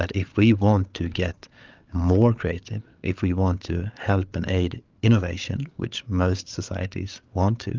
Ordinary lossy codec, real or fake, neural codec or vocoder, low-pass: Opus, 24 kbps; fake; codec, 16 kHz, 8 kbps, FreqCodec, larger model; 7.2 kHz